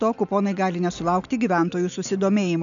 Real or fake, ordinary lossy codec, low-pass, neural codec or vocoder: real; MP3, 96 kbps; 7.2 kHz; none